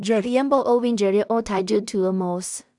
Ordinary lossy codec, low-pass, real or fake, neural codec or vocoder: none; 10.8 kHz; fake; codec, 16 kHz in and 24 kHz out, 0.4 kbps, LongCat-Audio-Codec, two codebook decoder